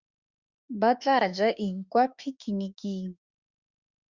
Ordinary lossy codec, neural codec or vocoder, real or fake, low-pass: Opus, 64 kbps; autoencoder, 48 kHz, 32 numbers a frame, DAC-VAE, trained on Japanese speech; fake; 7.2 kHz